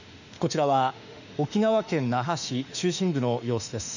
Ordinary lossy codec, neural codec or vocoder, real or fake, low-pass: none; autoencoder, 48 kHz, 32 numbers a frame, DAC-VAE, trained on Japanese speech; fake; 7.2 kHz